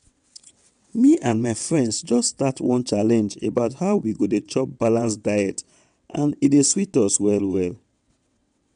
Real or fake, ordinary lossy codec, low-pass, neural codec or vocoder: fake; none; 9.9 kHz; vocoder, 22.05 kHz, 80 mel bands, WaveNeXt